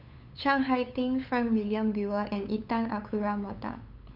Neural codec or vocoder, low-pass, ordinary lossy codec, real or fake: codec, 16 kHz, 8 kbps, FunCodec, trained on LibriTTS, 25 frames a second; 5.4 kHz; none; fake